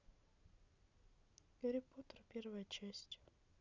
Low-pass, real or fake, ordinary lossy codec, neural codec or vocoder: 7.2 kHz; real; none; none